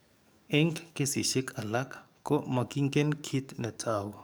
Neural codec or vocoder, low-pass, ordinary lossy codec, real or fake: codec, 44.1 kHz, 7.8 kbps, DAC; none; none; fake